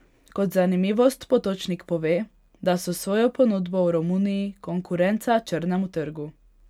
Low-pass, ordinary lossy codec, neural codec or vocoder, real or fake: 19.8 kHz; none; none; real